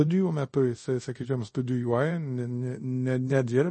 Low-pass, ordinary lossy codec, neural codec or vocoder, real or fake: 10.8 kHz; MP3, 32 kbps; codec, 24 kHz, 0.5 kbps, DualCodec; fake